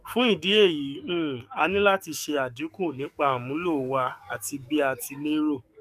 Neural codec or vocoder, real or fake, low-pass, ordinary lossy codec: codec, 44.1 kHz, 7.8 kbps, DAC; fake; 14.4 kHz; none